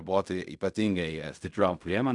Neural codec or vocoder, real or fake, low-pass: codec, 16 kHz in and 24 kHz out, 0.4 kbps, LongCat-Audio-Codec, fine tuned four codebook decoder; fake; 10.8 kHz